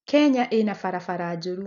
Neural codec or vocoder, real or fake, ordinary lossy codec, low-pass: none; real; none; 7.2 kHz